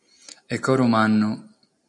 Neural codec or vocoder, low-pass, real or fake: none; 10.8 kHz; real